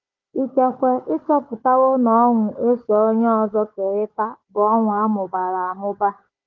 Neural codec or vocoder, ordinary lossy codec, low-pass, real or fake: codec, 16 kHz, 4 kbps, FunCodec, trained on Chinese and English, 50 frames a second; Opus, 24 kbps; 7.2 kHz; fake